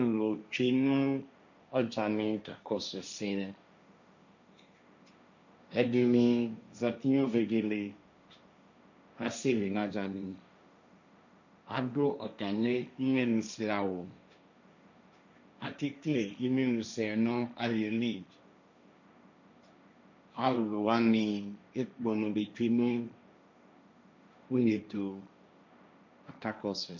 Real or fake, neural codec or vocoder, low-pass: fake; codec, 16 kHz, 1.1 kbps, Voila-Tokenizer; 7.2 kHz